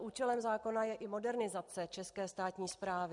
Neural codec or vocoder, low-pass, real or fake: none; 10.8 kHz; real